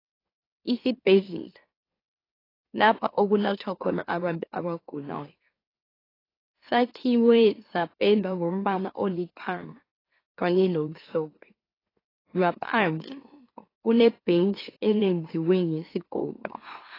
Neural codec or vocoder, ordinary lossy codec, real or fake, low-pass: autoencoder, 44.1 kHz, a latent of 192 numbers a frame, MeloTTS; AAC, 24 kbps; fake; 5.4 kHz